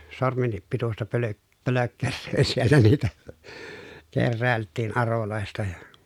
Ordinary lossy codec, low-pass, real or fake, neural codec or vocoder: none; 19.8 kHz; real; none